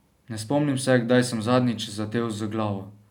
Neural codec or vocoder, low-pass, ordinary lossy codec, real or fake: none; 19.8 kHz; none; real